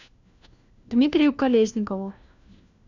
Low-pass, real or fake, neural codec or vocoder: 7.2 kHz; fake; codec, 16 kHz, 1 kbps, FunCodec, trained on LibriTTS, 50 frames a second